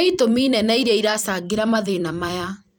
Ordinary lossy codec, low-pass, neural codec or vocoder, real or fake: none; none; vocoder, 44.1 kHz, 128 mel bands every 256 samples, BigVGAN v2; fake